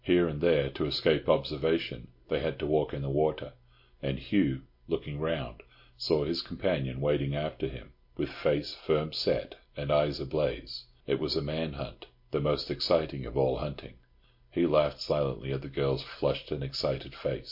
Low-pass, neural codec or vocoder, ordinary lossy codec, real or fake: 5.4 kHz; none; MP3, 32 kbps; real